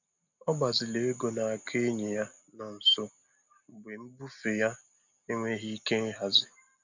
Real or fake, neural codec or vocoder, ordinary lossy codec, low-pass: real; none; none; 7.2 kHz